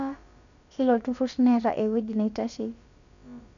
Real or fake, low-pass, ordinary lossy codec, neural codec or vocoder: fake; 7.2 kHz; none; codec, 16 kHz, about 1 kbps, DyCAST, with the encoder's durations